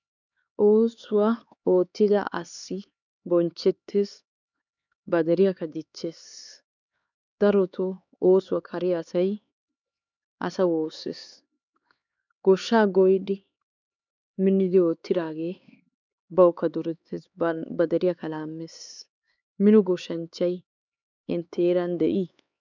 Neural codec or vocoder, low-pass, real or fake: codec, 16 kHz, 4 kbps, X-Codec, HuBERT features, trained on LibriSpeech; 7.2 kHz; fake